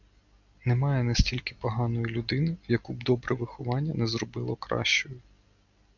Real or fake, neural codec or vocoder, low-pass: real; none; 7.2 kHz